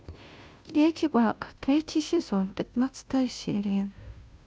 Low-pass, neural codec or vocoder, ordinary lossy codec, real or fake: none; codec, 16 kHz, 0.5 kbps, FunCodec, trained on Chinese and English, 25 frames a second; none; fake